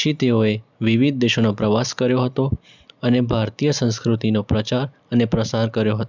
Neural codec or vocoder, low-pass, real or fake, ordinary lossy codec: none; 7.2 kHz; real; none